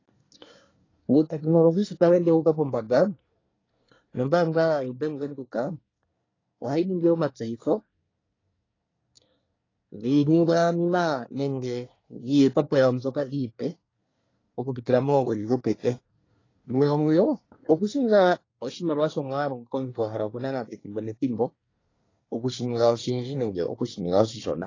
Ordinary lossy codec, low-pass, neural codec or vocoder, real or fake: AAC, 32 kbps; 7.2 kHz; codec, 24 kHz, 1 kbps, SNAC; fake